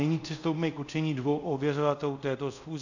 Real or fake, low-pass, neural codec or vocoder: fake; 7.2 kHz; codec, 24 kHz, 0.5 kbps, DualCodec